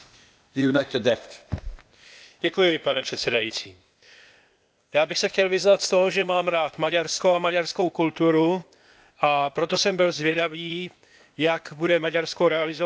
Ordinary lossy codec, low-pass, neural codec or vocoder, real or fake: none; none; codec, 16 kHz, 0.8 kbps, ZipCodec; fake